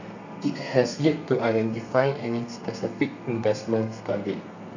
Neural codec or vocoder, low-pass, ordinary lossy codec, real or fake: codec, 32 kHz, 1.9 kbps, SNAC; 7.2 kHz; none; fake